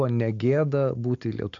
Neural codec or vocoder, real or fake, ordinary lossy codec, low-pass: none; real; MP3, 64 kbps; 7.2 kHz